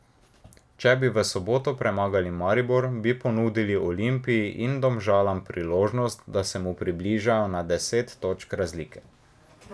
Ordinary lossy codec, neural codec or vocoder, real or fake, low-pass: none; none; real; none